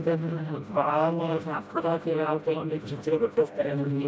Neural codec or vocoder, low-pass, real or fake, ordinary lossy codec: codec, 16 kHz, 0.5 kbps, FreqCodec, smaller model; none; fake; none